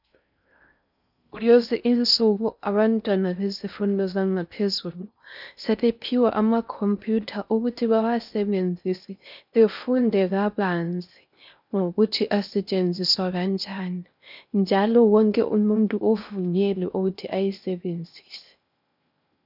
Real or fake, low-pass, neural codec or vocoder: fake; 5.4 kHz; codec, 16 kHz in and 24 kHz out, 0.6 kbps, FocalCodec, streaming, 2048 codes